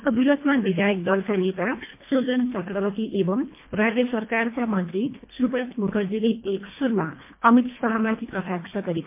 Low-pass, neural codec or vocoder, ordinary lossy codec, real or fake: 3.6 kHz; codec, 24 kHz, 1.5 kbps, HILCodec; MP3, 24 kbps; fake